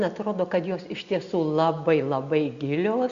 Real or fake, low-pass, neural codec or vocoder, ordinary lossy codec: real; 7.2 kHz; none; Opus, 64 kbps